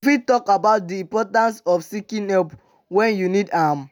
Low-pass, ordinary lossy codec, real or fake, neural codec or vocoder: none; none; real; none